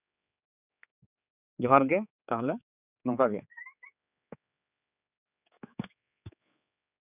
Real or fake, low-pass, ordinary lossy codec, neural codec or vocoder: fake; 3.6 kHz; none; codec, 16 kHz, 4 kbps, X-Codec, HuBERT features, trained on general audio